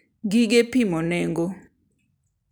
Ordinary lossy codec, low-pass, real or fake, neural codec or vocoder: none; none; real; none